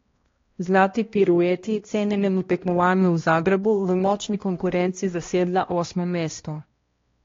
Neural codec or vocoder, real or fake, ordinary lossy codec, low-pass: codec, 16 kHz, 1 kbps, X-Codec, HuBERT features, trained on balanced general audio; fake; AAC, 32 kbps; 7.2 kHz